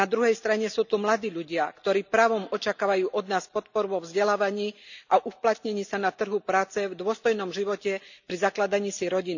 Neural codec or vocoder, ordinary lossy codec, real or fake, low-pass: none; none; real; 7.2 kHz